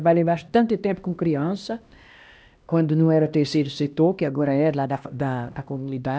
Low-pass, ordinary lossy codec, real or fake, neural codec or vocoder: none; none; fake; codec, 16 kHz, 1 kbps, X-Codec, HuBERT features, trained on LibriSpeech